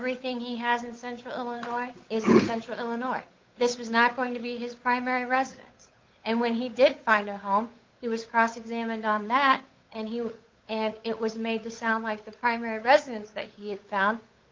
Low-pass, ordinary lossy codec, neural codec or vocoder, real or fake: 7.2 kHz; Opus, 32 kbps; codec, 16 kHz, 8 kbps, FunCodec, trained on Chinese and English, 25 frames a second; fake